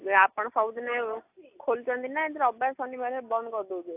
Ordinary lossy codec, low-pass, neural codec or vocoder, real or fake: AAC, 32 kbps; 3.6 kHz; none; real